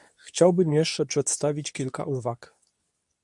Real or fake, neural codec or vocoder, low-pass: fake; codec, 24 kHz, 0.9 kbps, WavTokenizer, medium speech release version 2; 10.8 kHz